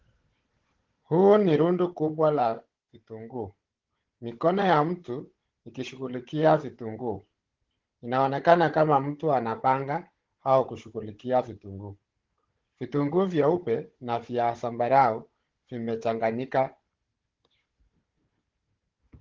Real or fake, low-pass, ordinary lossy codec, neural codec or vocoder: fake; 7.2 kHz; Opus, 16 kbps; codec, 16 kHz, 16 kbps, FunCodec, trained on Chinese and English, 50 frames a second